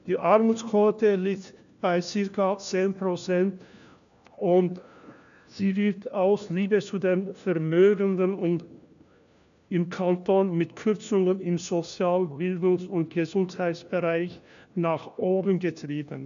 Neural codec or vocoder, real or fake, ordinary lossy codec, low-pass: codec, 16 kHz, 1 kbps, FunCodec, trained on LibriTTS, 50 frames a second; fake; none; 7.2 kHz